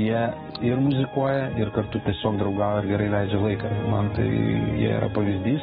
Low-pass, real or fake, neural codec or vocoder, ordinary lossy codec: 19.8 kHz; fake; codec, 44.1 kHz, 7.8 kbps, DAC; AAC, 16 kbps